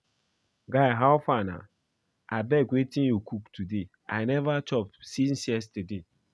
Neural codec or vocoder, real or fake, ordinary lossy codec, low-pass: none; real; none; none